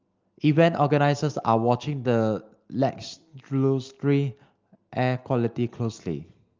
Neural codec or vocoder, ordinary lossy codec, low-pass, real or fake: none; Opus, 24 kbps; 7.2 kHz; real